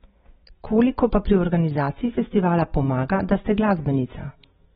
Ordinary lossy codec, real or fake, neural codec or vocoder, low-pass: AAC, 16 kbps; real; none; 9.9 kHz